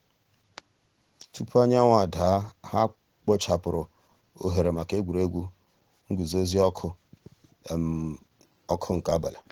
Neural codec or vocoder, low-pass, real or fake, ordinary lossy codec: none; 19.8 kHz; real; Opus, 16 kbps